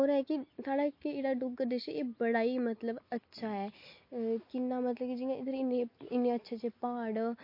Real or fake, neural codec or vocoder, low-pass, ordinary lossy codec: real; none; 5.4 kHz; MP3, 32 kbps